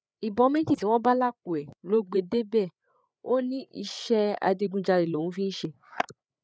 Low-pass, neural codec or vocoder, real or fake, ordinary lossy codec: none; codec, 16 kHz, 8 kbps, FreqCodec, larger model; fake; none